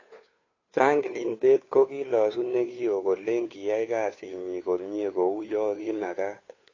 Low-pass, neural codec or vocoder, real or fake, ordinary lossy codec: 7.2 kHz; codec, 16 kHz, 2 kbps, FunCodec, trained on Chinese and English, 25 frames a second; fake; AAC, 32 kbps